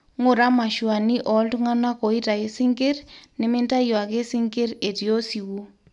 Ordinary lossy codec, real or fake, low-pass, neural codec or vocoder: none; real; 10.8 kHz; none